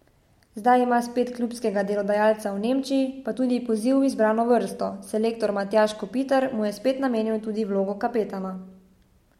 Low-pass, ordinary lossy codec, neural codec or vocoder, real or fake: 19.8 kHz; MP3, 64 kbps; none; real